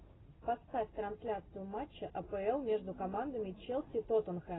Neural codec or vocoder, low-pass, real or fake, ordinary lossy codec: none; 7.2 kHz; real; AAC, 16 kbps